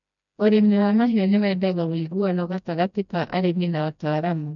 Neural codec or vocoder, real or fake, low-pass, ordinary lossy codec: codec, 16 kHz, 1 kbps, FreqCodec, smaller model; fake; 7.2 kHz; none